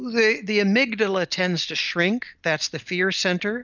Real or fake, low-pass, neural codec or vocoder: real; 7.2 kHz; none